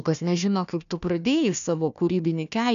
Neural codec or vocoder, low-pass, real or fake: codec, 16 kHz, 1 kbps, FunCodec, trained on Chinese and English, 50 frames a second; 7.2 kHz; fake